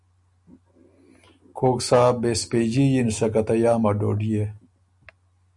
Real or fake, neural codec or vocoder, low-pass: real; none; 10.8 kHz